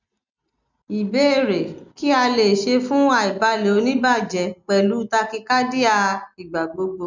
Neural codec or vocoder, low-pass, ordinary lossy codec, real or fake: none; 7.2 kHz; none; real